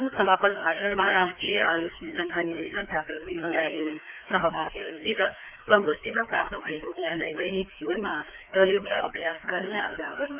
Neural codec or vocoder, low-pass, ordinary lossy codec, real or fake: codec, 16 kHz, 2 kbps, FreqCodec, larger model; 3.6 kHz; none; fake